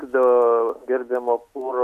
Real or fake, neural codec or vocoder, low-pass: real; none; 14.4 kHz